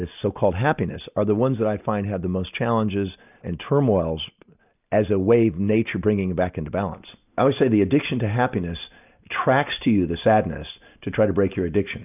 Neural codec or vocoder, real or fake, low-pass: none; real; 3.6 kHz